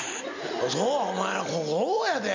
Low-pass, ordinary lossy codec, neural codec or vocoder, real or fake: 7.2 kHz; MP3, 48 kbps; none; real